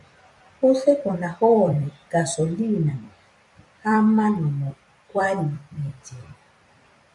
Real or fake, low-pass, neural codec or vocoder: fake; 10.8 kHz; vocoder, 44.1 kHz, 128 mel bands every 256 samples, BigVGAN v2